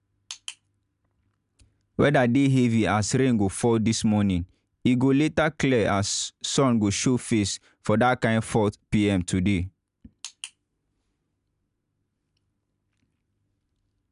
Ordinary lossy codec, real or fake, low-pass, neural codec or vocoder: none; real; 10.8 kHz; none